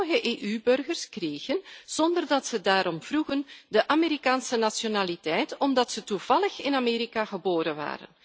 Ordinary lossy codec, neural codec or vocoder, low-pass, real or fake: none; none; none; real